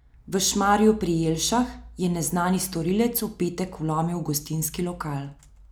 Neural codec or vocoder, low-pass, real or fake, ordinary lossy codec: none; none; real; none